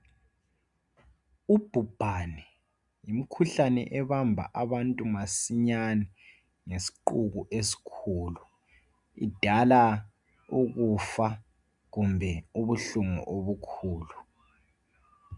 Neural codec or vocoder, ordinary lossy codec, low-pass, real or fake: none; MP3, 96 kbps; 10.8 kHz; real